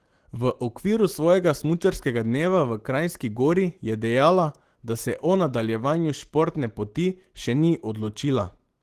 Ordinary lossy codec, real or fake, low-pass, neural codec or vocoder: Opus, 16 kbps; fake; 14.4 kHz; autoencoder, 48 kHz, 128 numbers a frame, DAC-VAE, trained on Japanese speech